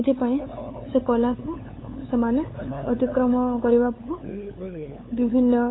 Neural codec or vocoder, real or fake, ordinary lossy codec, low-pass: codec, 16 kHz, 8 kbps, FunCodec, trained on LibriTTS, 25 frames a second; fake; AAC, 16 kbps; 7.2 kHz